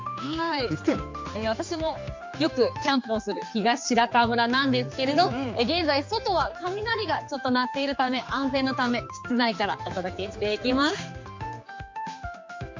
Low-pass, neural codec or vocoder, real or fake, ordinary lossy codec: 7.2 kHz; codec, 16 kHz, 4 kbps, X-Codec, HuBERT features, trained on general audio; fake; MP3, 48 kbps